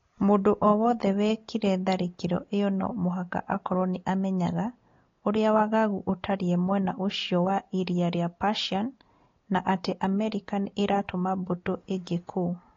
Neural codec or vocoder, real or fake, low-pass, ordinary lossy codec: none; real; 7.2 kHz; AAC, 32 kbps